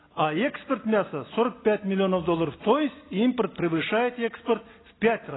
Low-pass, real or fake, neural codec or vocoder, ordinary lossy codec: 7.2 kHz; real; none; AAC, 16 kbps